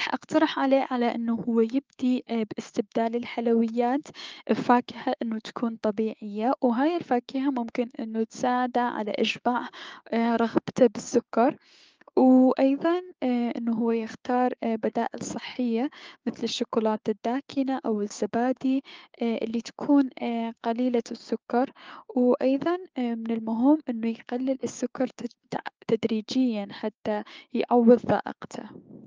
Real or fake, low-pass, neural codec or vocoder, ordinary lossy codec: fake; 7.2 kHz; codec, 16 kHz, 6 kbps, DAC; Opus, 24 kbps